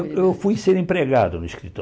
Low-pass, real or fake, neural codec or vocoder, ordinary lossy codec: none; real; none; none